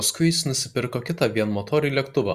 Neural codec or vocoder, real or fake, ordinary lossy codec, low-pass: none; real; Opus, 64 kbps; 14.4 kHz